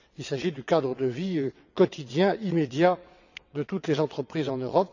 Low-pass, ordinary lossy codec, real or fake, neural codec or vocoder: 7.2 kHz; none; fake; vocoder, 22.05 kHz, 80 mel bands, WaveNeXt